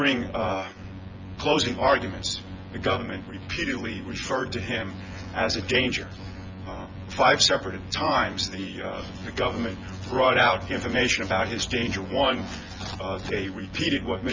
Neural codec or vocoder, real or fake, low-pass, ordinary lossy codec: vocoder, 24 kHz, 100 mel bands, Vocos; fake; 7.2 kHz; Opus, 32 kbps